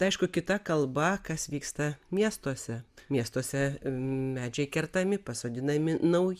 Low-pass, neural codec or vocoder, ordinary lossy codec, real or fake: 14.4 kHz; none; Opus, 64 kbps; real